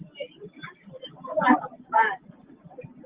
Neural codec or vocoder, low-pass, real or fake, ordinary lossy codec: none; 3.6 kHz; real; Opus, 16 kbps